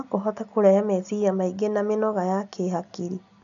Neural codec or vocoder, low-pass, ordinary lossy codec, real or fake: none; 7.2 kHz; none; real